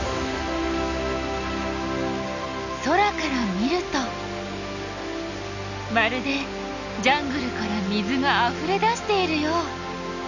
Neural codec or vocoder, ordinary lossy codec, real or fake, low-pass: none; none; real; 7.2 kHz